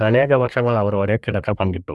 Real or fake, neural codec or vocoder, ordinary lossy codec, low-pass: fake; codec, 24 kHz, 1 kbps, SNAC; none; none